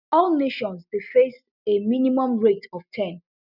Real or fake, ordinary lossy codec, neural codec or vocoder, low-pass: real; none; none; 5.4 kHz